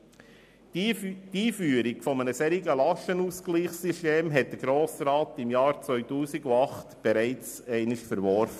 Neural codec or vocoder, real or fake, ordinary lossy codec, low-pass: none; real; MP3, 96 kbps; 14.4 kHz